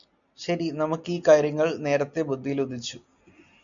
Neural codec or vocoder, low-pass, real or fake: none; 7.2 kHz; real